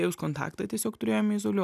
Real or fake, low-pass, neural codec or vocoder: real; 14.4 kHz; none